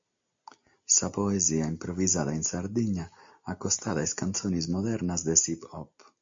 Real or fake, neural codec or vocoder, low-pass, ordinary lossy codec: real; none; 7.2 kHz; MP3, 48 kbps